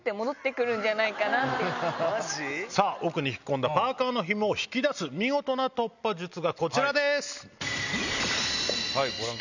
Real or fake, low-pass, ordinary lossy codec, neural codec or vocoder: real; 7.2 kHz; none; none